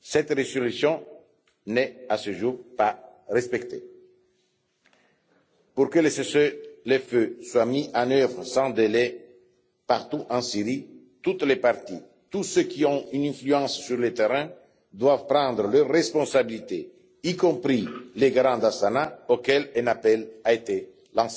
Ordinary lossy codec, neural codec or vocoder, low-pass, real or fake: none; none; none; real